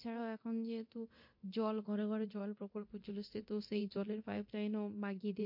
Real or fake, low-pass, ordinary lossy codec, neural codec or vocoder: fake; 5.4 kHz; MP3, 32 kbps; codec, 24 kHz, 0.9 kbps, DualCodec